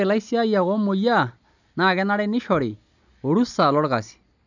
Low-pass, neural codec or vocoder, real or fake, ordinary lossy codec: 7.2 kHz; none; real; none